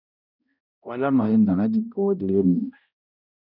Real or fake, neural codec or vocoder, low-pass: fake; codec, 16 kHz, 0.5 kbps, X-Codec, HuBERT features, trained on balanced general audio; 5.4 kHz